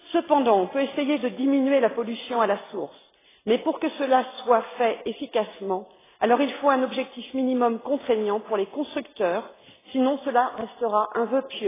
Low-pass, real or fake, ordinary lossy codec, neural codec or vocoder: 3.6 kHz; real; AAC, 16 kbps; none